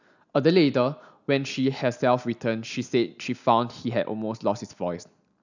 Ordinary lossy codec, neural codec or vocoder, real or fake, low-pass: none; none; real; 7.2 kHz